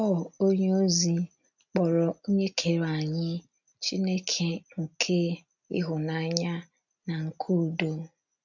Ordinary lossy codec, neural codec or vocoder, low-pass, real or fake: none; none; 7.2 kHz; real